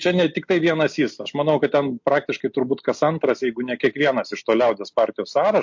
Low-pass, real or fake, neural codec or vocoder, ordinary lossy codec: 7.2 kHz; real; none; MP3, 48 kbps